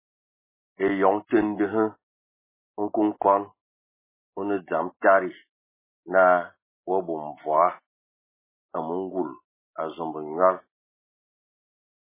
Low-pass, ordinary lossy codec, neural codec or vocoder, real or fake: 3.6 kHz; MP3, 16 kbps; none; real